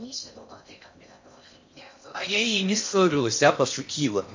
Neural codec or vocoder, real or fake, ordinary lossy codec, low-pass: codec, 16 kHz in and 24 kHz out, 0.6 kbps, FocalCodec, streaming, 4096 codes; fake; MP3, 48 kbps; 7.2 kHz